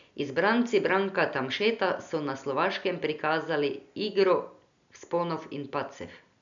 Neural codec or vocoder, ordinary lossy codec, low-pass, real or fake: none; none; 7.2 kHz; real